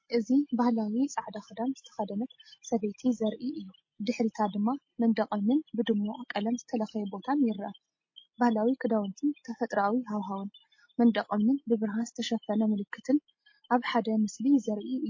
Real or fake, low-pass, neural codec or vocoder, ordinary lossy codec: real; 7.2 kHz; none; MP3, 32 kbps